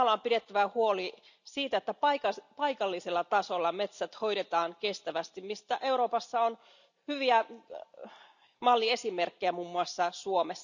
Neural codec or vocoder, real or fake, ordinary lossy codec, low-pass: none; real; none; 7.2 kHz